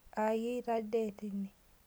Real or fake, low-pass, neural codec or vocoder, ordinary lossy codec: real; none; none; none